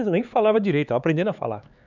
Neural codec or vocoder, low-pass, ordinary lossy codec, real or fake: codec, 16 kHz, 4 kbps, X-Codec, HuBERT features, trained on LibriSpeech; 7.2 kHz; none; fake